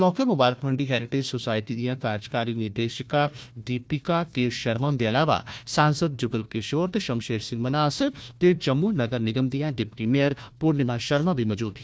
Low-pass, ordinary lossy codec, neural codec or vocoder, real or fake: none; none; codec, 16 kHz, 1 kbps, FunCodec, trained on Chinese and English, 50 frames a second; fake